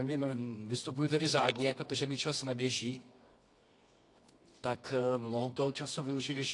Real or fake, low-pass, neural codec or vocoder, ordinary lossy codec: fake; 10.8 kHz; codec, 24 kHz, 0.9 kbps, WavTokenizer, medium music audio release; AAC, 48 kbps